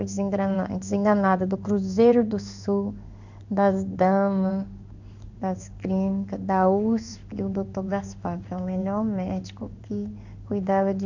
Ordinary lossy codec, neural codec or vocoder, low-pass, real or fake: none; codec, 16 kHz in and 24 kHz out, 1 kbps, XY-Tokenizer; 7.2 kHz; fake